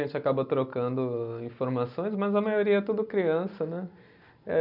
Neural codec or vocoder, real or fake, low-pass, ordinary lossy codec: none; real; 5.4 kHz; none